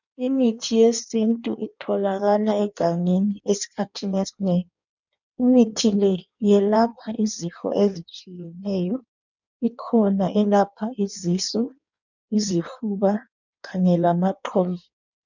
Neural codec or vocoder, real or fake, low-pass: codec, 16 kHz in and 24 kHz out, 1.1 kbps, FireRedTTS-2 codec; fake; 7.2 kHz